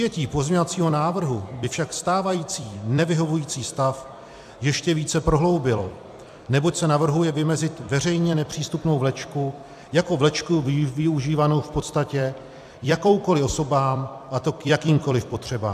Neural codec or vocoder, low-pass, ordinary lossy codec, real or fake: vocoder, 44.1 kHz, 128 mel bands every 256 samples, BigVGAN v2; 14.4 kHz; AAC, 96 kbps; fake